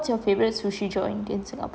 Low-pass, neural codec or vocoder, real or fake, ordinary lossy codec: none; none; real; none